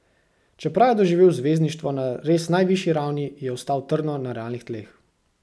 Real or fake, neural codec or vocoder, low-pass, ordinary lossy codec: real; none; none; none